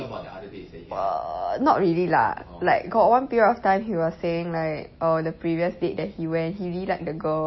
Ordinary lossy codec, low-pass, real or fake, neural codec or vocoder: MP3, 24 kbps; 7.2 kHz; real; none